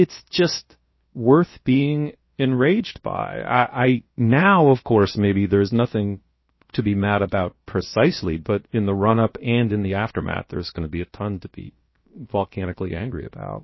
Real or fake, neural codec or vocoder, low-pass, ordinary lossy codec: fake; codec, 16 kHz, about 1 kbps, DyCAST, with the encoder's durations; 7.2 kHz; MP3, 24 kbps